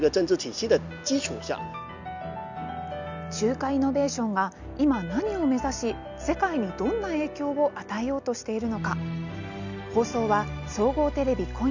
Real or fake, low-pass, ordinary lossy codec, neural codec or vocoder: real; 7.2 kHz; none; none